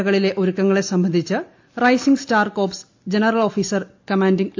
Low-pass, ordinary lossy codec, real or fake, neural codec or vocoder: 7.2 kHz; AAC, 48 kbps; real; none